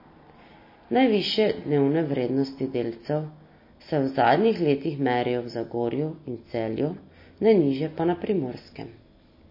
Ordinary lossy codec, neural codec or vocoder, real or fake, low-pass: MP3, 24 kbps; none; real; 5.4 kHz